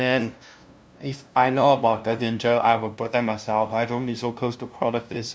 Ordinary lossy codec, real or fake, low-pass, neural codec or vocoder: none; fake; none; codec, 16 kHz, 0.5 kbps, FunCodec, trained on LibriTTS, 25 frames a second